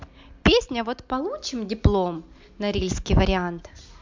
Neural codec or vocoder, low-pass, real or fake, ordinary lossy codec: none; 7.2 kHz; real; MP3, 64 kbps